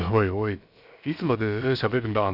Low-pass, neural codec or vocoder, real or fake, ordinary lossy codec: 5.4 kHz; codec, 16 kHz, about 1 kbps, DyCAST, with the encoder's durations; fake; none